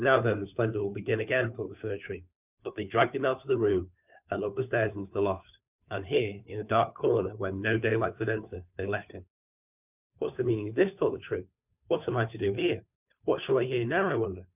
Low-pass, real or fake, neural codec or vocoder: 3.6 kHz; fake; codec, 16 kHz, 2 kbps, FunCodec, trained on Chinese and English, 25 frames a second